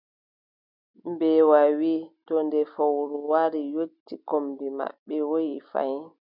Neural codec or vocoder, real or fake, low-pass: none; real; 5.4 kHz